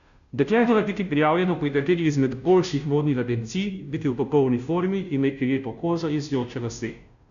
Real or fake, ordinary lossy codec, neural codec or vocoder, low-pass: fake; none; codec, 16 kHz, 0.5 kbps, FunCodec, trained on Chinese and English, 25 frames a second; 7.2 kHz